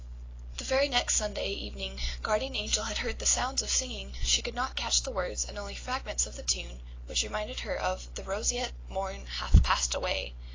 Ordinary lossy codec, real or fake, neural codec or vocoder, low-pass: AAC, 32 kbps; real; none; 7.2 kHz